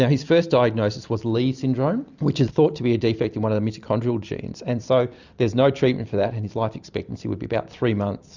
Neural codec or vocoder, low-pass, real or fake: none; 7.2 kHz; real